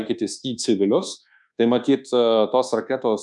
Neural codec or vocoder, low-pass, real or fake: codec, 24 kHz, 1.2 kbps, DualCodec; 10.8 kHz; fake